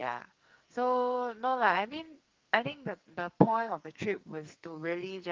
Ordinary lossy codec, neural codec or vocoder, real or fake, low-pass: Opus, 32 kbps; codec, 44.1 kHz, 2.6 kbps, SNAC; fake; 7.2 kHz